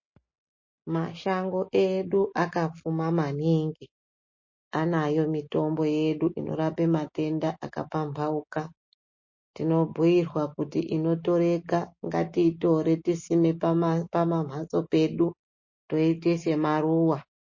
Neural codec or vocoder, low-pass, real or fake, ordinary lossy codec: none; 7.2 kHz; real; MP3, 32 kbps